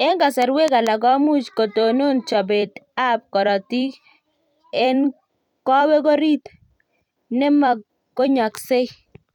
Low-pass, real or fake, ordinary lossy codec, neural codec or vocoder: 19.8 kHz; fake; none; vocoder, 44.1 kHz, 128 mel bands every 256 samples, BigVGAN v2